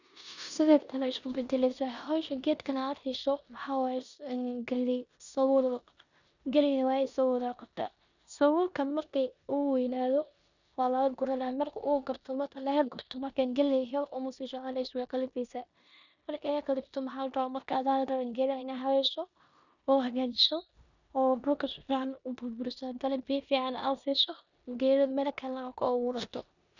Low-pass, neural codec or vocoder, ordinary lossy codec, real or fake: 7.2 kHz; codec, 16 kHz in and 24 kHz out, 0.9 kbps, LongCat-Audio-Codec, four codebook decoder; none; fake